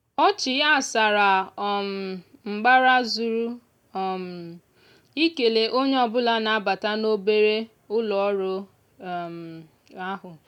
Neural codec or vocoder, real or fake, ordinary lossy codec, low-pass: none; real; none; 19.8 kHz